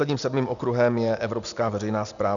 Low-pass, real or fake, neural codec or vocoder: 7.2 kHz; real; none